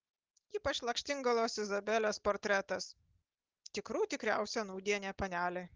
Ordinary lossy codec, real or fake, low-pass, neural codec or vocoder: Opus, 16 kbps; real; 7.2 kHz; none